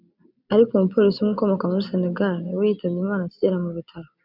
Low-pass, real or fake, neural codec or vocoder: 5.4 kHz; real; none